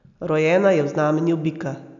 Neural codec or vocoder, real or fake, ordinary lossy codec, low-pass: none; real; none; 7.2 kHz